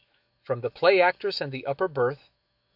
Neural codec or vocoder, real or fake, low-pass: autoencoder, 48 kHz, 128 numbers a frame, DAC-VAE, trained on Japanese speech; fake; 5.4 kHz